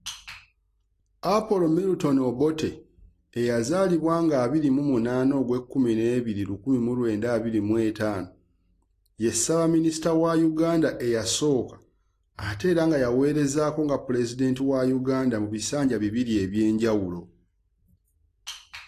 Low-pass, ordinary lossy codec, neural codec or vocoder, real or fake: 14.4 kHz; AAC, 48 kbps; none; real